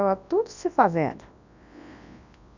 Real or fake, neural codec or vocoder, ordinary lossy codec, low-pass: fake; codec, 24 kHz, 0.9 kbps, WavTokenizer, large speech release; none; 7.2 kHz